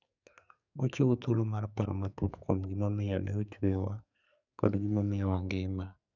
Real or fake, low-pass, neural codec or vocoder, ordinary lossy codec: fake; 7.2 kHz; codec, 44.1 kHz, 2.6 kbps, SNAC; none